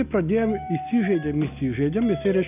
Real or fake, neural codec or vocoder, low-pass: real; none; 3.6 kHz